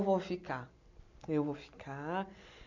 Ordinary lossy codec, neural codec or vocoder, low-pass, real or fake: none; none; 7.2 kHz; real